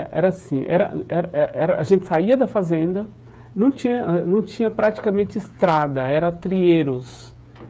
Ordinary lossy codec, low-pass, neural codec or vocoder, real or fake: none; none; codec, 16 kHz, 8 kbps, FreqCodec, smaller model; fake